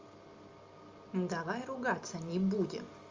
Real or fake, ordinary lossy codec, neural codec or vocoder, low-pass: real; Opus, 32 kbps; none; 7.2 kHz